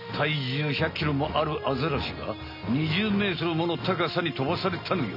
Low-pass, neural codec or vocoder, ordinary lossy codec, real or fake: 5.4 kHz; none; none; real